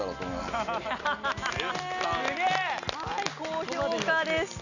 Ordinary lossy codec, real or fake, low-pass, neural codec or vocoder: none; real; 7.2 kHz; none